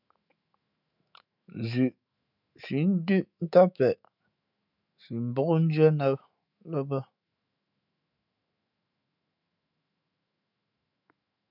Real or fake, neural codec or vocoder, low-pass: fake; autoencoder, 48 kHz, 128 numbers a frame, DAC-VAE, trained on Japanese speech; 5.4 kHz